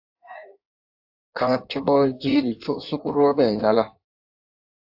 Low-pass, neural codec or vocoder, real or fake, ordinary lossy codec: 5.4 kHz; codec, 16 kHz in and 24 kHz out, 1.1 kbps, FireRedTTS-2 codec; fake; AAC, 48 kbps